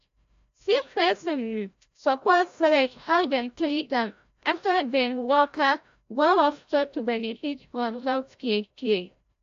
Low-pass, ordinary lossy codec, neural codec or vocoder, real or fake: 7.2 kHz; AAC, 64 kbps; codec, 16 kHz, 0.5 kbps, FreqCodec, larger model; fake